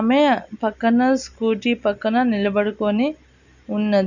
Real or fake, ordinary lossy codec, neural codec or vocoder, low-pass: real; none; none; 7.2 kHz